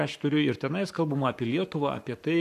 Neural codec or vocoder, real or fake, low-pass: codec, 44.1 kHz, 7.8 kbps, Pupu-Codec; fake; 14.4 kHz